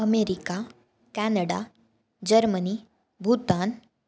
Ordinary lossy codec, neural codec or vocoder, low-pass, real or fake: none; none; none; real